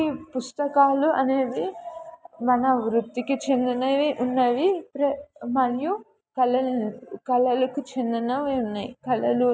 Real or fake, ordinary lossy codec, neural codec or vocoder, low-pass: real; none; none; none